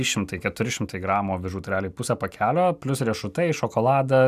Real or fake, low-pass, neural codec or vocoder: real; 14.4 kHz; none